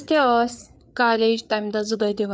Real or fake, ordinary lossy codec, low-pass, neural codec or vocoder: fake; none; none; codec, 16 kHz, 4 kbps, FreqCodec, larger model